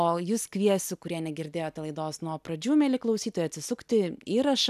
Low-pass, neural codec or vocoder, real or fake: 14.4 kHz; none; real